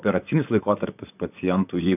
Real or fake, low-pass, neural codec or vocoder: fake; 3.6 kHz; vocoder, 44.1 kHz, 128 mel bands, Pupu-Vocoder